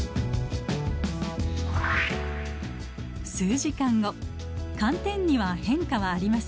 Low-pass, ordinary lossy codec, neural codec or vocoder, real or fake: none; none; none; real